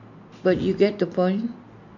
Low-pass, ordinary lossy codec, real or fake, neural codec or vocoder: 7.2 kHz; none; real; none